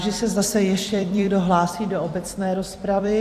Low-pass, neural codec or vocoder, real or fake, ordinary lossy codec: 14.4 kHz; vocoder, 44.1 kHz, 128 mel bands every 256 samples, BigVGAN v2; fake; AAC, 64 kbps